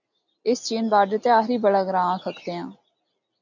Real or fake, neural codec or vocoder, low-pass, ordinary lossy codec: real; none; 7.2 kHz; AAC, 48 kbps